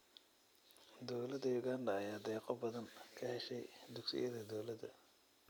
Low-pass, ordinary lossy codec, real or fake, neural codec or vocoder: none; none; real; none